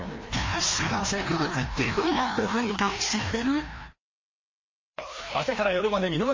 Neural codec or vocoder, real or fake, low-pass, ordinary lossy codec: codec, 16 kHz, 1 kbps, FreqCodec, larger model; fake; 7.2 kHz; MP3, 32 kbps